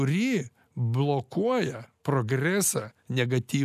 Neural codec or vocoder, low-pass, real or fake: none; 14.4 kHz; real